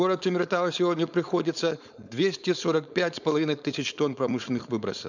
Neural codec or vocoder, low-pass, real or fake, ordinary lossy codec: codec, 16 kHz, 4.8 kbps, FACodec; 7.2 kHz; fake; none